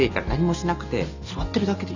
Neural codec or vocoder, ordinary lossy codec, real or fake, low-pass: none; none; real; 7.2 kHz